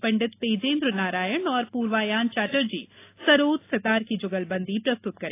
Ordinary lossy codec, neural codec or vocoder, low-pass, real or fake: AAC, 24 kbps; none; 3.6 kHz; real